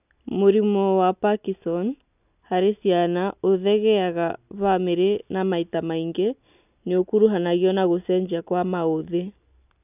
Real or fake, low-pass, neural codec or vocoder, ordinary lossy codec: real; 3.6 kHz; none; none